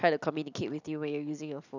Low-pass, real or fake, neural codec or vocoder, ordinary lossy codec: 7.2 kHz; real; none; none